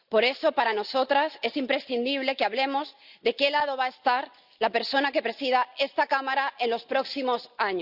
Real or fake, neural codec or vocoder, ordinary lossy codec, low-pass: real; none; Opus, 64 kbps; 5.4 kHz